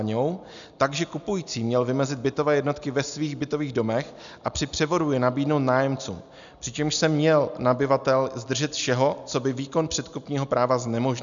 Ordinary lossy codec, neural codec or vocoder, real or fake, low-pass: MP3, 96 kbps; none; real; 7.2 kHz